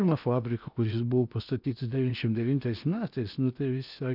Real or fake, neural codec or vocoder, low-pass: fake; codec, 16 kHz in and 24 kHz out, 0.8 kbps, FocalCodec, streaming, 65536 codes; 5.4 kHz